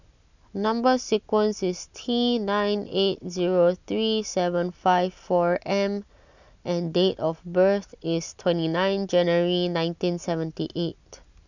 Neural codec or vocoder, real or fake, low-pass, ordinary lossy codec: none; real; 7.2 kHz; none